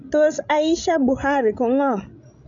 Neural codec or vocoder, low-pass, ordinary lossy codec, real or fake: codec, 16 kHz, 16 kbps, FreqCodec, larger model; 7.2 kHz; none; fake